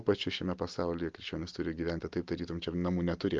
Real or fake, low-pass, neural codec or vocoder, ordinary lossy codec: real; 7.2 kHz; none; Opus, 32 kbps